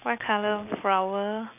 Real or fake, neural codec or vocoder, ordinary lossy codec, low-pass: real; none; none; 3.6 kHz